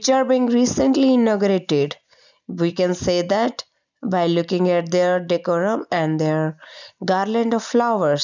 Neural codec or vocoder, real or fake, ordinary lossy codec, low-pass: none; real; none; 7.2 kHz